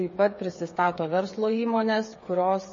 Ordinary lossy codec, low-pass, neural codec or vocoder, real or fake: MP3, 32 kbps; 7.2 kHz; codec, 16 kHz, 8 kbps, FreqCodec, smaller model; fake